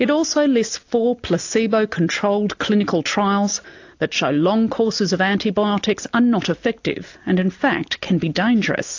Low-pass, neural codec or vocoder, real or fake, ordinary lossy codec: 7.2 kHz; none; real; AAC, 48 kbps